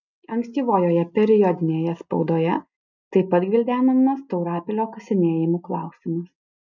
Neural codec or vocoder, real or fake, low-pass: none; real; 7.2 kHz